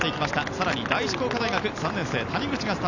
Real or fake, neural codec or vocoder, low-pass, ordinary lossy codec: real; none; 7.2 kHz; none